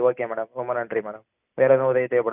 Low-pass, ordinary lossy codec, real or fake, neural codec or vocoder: 3.6 kHz; none; real; none